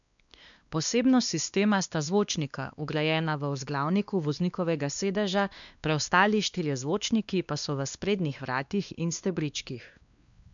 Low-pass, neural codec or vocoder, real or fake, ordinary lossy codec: 7.2 kHz; codec, 16 kHz, 2 kbps, X-Codec, WavLM features, trained on Multilingual LibriSpeech; fake; none